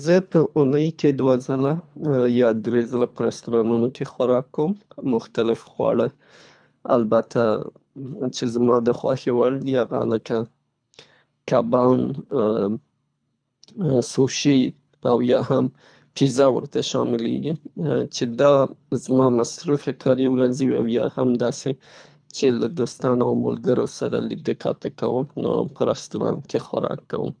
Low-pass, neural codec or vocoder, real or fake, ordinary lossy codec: 9.9 kHz; codec, 24 kHz, 3 kbps, HILCodec; fake; none